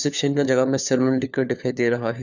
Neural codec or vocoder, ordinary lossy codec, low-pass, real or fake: codec, 16 kHz, 4 kbps, FunCodec, trained on LibriTTS, 50 frames a second; none; 7.2 kHz; fake